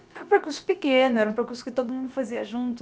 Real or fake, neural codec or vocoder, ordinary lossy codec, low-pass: fake; codec, 16 kHz, about 1 kbps, DyCAST, with the encoder's durations; none; none